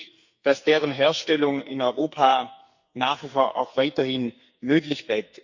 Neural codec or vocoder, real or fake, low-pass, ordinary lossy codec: codec, 44.1 kHz, 2.6 kbps, SNAC; fake; 7.2 kHz; Opus, 64 kbps